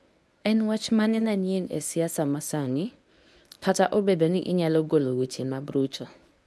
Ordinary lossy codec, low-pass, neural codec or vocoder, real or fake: none; none; codec, 24 kHz, 0.9 kbps, WavTokenizer, medium speech release version 1; fake